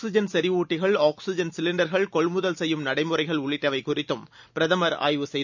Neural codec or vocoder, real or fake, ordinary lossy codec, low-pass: none; real; none; 7.2 kHz